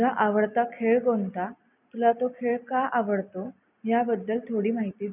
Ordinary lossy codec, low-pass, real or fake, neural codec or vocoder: none; 3.6 kHz; real; none